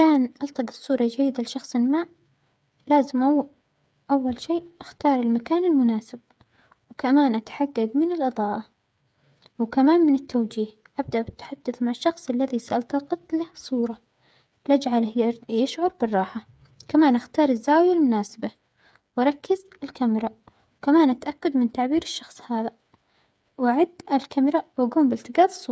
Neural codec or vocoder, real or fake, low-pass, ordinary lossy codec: codec, 16 kHz, 8 kbps, FreqCodec, smaller model; fake; none; none